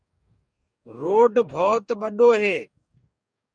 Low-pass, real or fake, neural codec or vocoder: 9.9 kHz; fake; codec, 44.1 kHz, 2.6 kbps, DAC